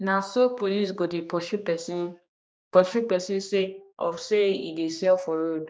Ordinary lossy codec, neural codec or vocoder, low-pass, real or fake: none; codec, 16 kHz, 2 kbps, X-Codec, HuBERT features, trained on general audio; none; fake